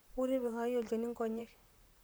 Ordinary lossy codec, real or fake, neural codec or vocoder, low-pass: none; fake; vocoder, 44.1 kHz, 128 mel bands, Pupu-Vocoder; none